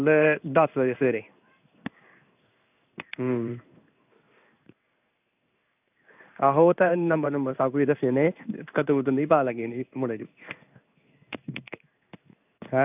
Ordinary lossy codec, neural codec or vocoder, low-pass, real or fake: none; codec, 16 kHz in and 24 kHz out, 1 kbps, XY-Tokenizer; 3.6 kHz; fake